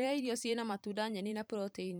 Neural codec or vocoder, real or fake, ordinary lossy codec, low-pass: vocoder, 44.1 kHz, 128 mel bands every 512 samples, BigVGAN v2; fake; none; none